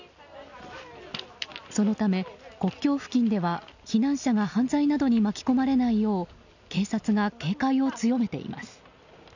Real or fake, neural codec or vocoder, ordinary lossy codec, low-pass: real; none; none; 7.2 kHz